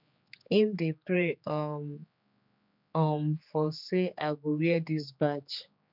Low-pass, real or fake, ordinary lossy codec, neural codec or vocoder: 5.4 kHz; fake; none; codec, 16 kHz, 4 kbps, X-Codec, HuBERT features, trained on general audio